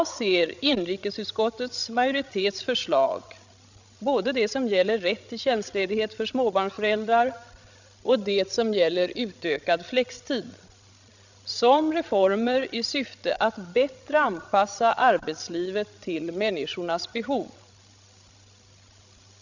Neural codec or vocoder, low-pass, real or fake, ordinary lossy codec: codec, 16 kHz, 16 kbps, FreqCodec, larger model; 7.2 kHz; fake; none